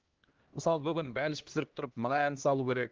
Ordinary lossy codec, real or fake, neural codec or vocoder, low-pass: Opus, 16 kbps; fake; codec, 16 kHz, 1 kbps, X-Codec, HuBERT features, trained on LibriSpeech; 7.2 kHz